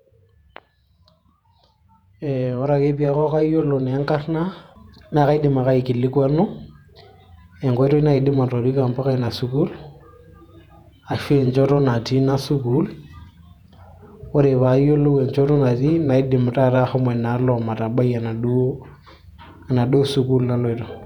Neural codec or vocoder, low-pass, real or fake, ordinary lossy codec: vocoder, 48 kHz, 128 mel bands, Vocos; 19.8 kHz; fake; none